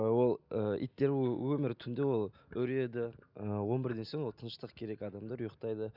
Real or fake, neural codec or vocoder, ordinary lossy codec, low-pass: real; none; none; 5.4 kHz